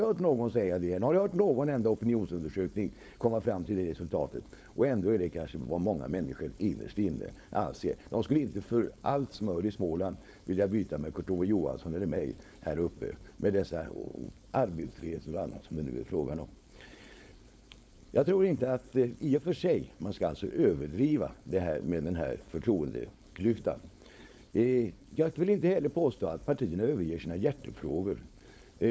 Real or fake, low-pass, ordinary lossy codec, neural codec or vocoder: fake; none; none; codec, 16 kHz, 4.8 kbps, FACodec